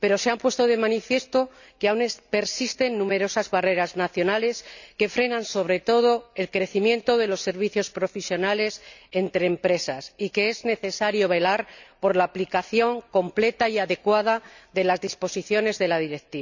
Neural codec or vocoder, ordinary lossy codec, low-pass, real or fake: none; none; 7.2 kHz; real